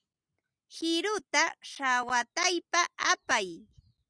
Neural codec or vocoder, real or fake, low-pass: none; real; 9.9 kHz